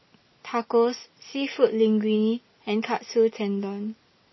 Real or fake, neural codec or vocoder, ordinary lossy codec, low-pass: real; none; MP3, 24 kbps; 7.2 kHz